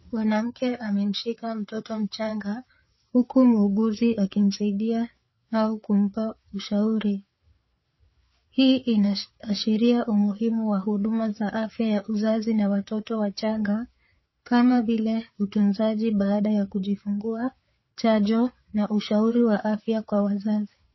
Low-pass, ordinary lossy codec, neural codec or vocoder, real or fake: 7.2 kHz; MP3, 24 kbps; codec, 16 kHz, 4 kbps, FreqCodec, larger model; fake